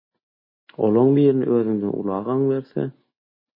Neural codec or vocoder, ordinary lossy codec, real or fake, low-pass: none; MP3, 24 kbps; real; 5.4 kHz